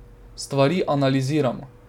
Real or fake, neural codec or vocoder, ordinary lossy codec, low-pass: real; none; none; 19.8 kHz